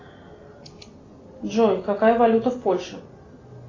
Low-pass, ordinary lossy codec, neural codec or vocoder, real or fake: 7.2 kHz; AAC, 32 kbps; none; real